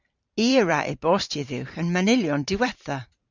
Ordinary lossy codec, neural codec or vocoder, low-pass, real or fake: Opus, 64 kbps; vocoder, 44.1 kHz, 128 mel bands every 512 samples, BigVGAN v2; 7.2 kHz; fake